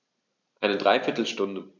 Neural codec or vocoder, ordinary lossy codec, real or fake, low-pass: none; none; real; 7.2 kHz